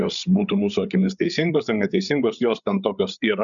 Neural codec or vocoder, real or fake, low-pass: codec, 16 kHz, 8 kbps, FreqCodec, larger model; fake; 7.2 kHz